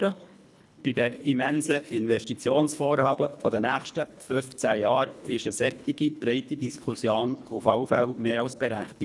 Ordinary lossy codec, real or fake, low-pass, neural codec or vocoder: none; fake; none; codec, 24 kHz, 1.5 kbps, HILCodec